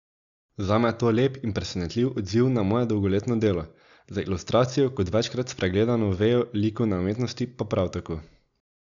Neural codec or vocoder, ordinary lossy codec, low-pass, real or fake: none; none; 7.2 kHz; real